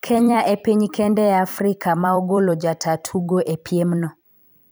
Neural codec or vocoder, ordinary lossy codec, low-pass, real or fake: vocoder, 44.1 kHz, 128 mel bands every 256 samples, BigVGAN v2; none; none; fake